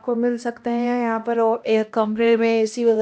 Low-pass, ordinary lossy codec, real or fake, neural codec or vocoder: none; none; fake; codec, 16 kHz, 1 kbps, X-Codec, HuBERT features, trained on LibriSpeech